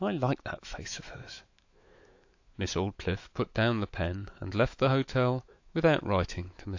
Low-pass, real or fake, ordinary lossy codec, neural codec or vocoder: 7.2 kHz; fake; AAC, 48 kbps; autoencoder, 48 kHz, 128 numbers a frame, DAC-VAE, trained on Japanese speech